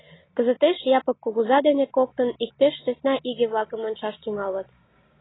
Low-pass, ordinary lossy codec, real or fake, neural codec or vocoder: 7.2 kHz; AAC, 16 kbps; real; none